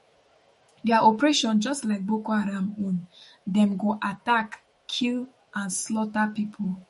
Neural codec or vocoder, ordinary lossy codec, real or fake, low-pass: autoencoder, 48 kHz, 128 numbers a frame, DAC-VAE, trained on Japanese speech; MP3, 48 kbps; fake; 19.8 kHz